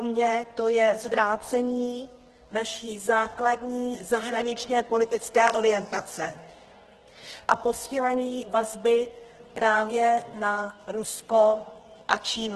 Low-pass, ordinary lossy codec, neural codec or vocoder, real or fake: 10.8 kHz; Opus, 16 kbps; codec, 24 kHz, 0.9 kbps, WavTokenizer, medium music audio release; fake